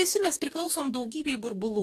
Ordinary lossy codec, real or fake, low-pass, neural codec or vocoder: AAC, 64 kbps; fake; 14.4 kHz; codec, 44.1 kHz, 2.6 kbps, DAC